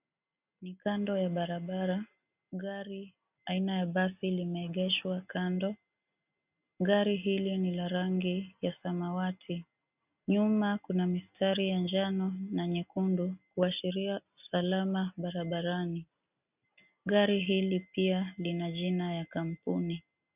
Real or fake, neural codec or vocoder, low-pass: real; none; 3.6 kHz